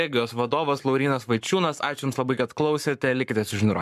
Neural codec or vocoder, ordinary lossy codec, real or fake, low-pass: codec, 44.1 kHz, 7.8 kbps, Pupu-Codec; MP3, 96 kbps; fake; 14.4 kHz